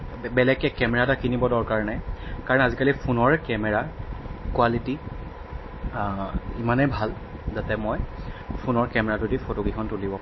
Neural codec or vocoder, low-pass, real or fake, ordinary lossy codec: none; 7.2 kHz; real; MP3, 24 kbps